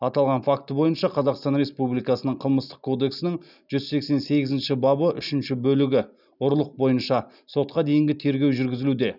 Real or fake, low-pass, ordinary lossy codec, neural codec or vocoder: real; 5.4 kHz; none; none